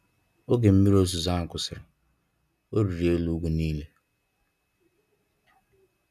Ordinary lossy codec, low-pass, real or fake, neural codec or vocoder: none; 14.4 kHz; real; none